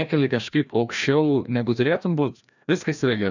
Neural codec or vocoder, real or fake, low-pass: codec, 16 kHz, 1 kbps, FreqCodec, larger model; fake; 7.2 kHz